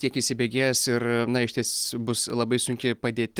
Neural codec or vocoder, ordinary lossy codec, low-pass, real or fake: none; Opus, 24 kbps; 19.8 kHz; real